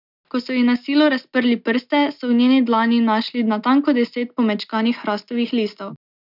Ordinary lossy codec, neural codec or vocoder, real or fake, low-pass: none; none; real; 5.4 kHz